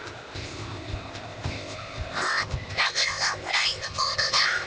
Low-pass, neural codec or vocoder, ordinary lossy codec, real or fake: none; codec, 16 kHz, 0.8 kbps, ZipCodec; none; fake